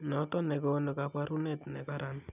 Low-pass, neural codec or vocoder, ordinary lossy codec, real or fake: 3.6 kHz; none; none; real